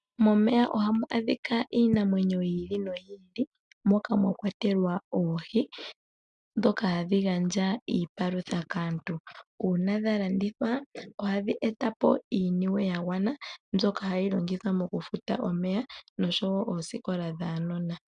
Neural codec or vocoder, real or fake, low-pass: none; real; 9.9 kHz